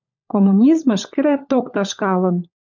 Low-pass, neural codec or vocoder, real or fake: 7.2 kHz; codec, 16 kHz, 16 kbps, FunCodec, trained on LibriTTS, 50 frames a second; fake